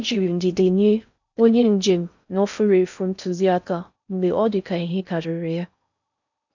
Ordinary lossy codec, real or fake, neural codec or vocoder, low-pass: none; fake; codec, 16 kHz in and 24 kHz out, 0.6 kbps, FocalCodec, streaming, 4096 codes; 7.2 kHz